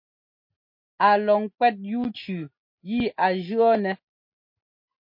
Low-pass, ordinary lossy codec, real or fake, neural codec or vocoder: 5.4 kHz; MP3, 32 kbps; real; none